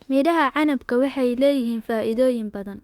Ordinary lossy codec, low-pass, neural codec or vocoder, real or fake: Opus, 64 kbps; 19.8 kHz; autoencoder, 48 kHz, 32 numbers a frame, DAC-VAE, trained on Japanese speech; fake